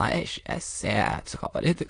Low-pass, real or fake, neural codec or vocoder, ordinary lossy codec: 9.9 kHz; fake; autoencoder, 22.05 kHz, a latent of 192 numbers a frame, VITS, trained on many speakers; AAC, 48 kbps